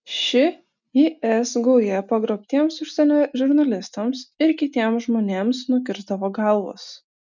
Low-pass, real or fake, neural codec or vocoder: 7.2 kHz; real; none